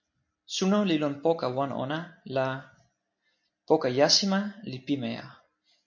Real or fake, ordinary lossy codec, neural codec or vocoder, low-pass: real; MP3, 48 kbps; none; 7.2 kHz